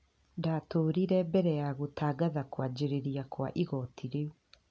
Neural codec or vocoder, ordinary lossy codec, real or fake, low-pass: none; none; real; none